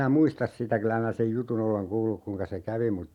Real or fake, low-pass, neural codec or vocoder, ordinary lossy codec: real; 19.8 kHz; none; none